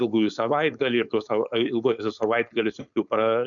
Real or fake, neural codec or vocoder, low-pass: fake; codec, 16 kHz, 4.8 kbps, FACodec; 7.2 kHz